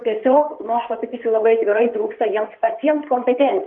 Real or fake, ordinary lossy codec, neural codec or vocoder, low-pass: fake; Opus, 24 kbps; codec, 16 kHz in and 24 kHz out, 2.2 kbps, FireRedTTS-2 codec; 9.9 kHz